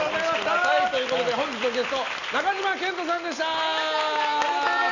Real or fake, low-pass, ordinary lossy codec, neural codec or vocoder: real; 7.2 kHz; none; none